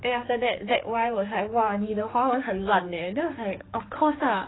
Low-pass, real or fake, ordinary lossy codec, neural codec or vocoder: 7.2 kHz; fake; AAC, 16 kbps; codec, 16 kHz, 4 kbps, X-Codec, HuBERT features, trained on general audio